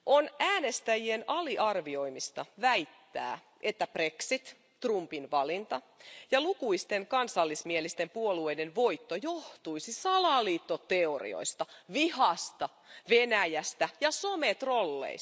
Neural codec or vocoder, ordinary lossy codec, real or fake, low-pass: none; none; real; none